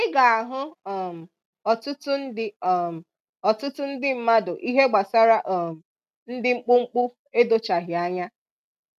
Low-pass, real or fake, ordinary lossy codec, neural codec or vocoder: 14.4 kHz; real; none; none